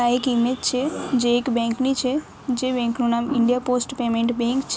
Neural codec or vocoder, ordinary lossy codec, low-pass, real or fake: none; none; none; real